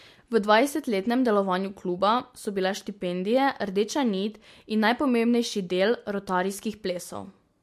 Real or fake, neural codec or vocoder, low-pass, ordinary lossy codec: real; none; 14.4 kHz; MP3, 64 kbps